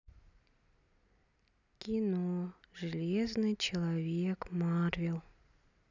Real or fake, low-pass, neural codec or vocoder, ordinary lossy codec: real; 7.2 kHz; none; none